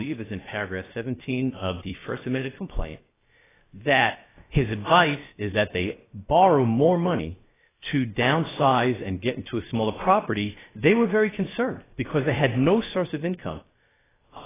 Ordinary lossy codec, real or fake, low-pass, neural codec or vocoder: AAC, 16 kbps; fake; 3.6 kHz; codec, 16 kHz, about 1 kbps, DyCAST, with the encoder's durations